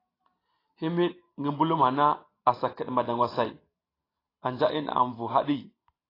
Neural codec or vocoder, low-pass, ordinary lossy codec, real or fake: none; 5.4 kHz; AAC, 24 kbps; real